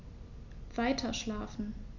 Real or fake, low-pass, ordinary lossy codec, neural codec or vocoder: real; 7.2 kHz; Opus, 64 kbps; none